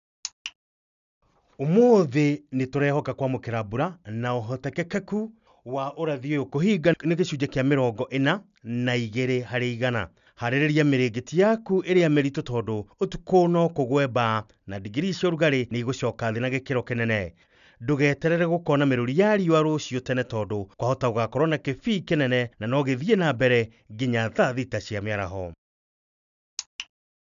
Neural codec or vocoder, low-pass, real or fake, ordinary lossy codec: none; 7.2 kHz; real; none